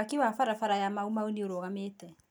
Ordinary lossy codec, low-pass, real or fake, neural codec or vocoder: none; none; real; none